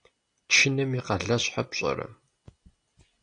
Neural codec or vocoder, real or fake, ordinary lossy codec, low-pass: none; real; AAC, 48 kbps; 9.9 kHz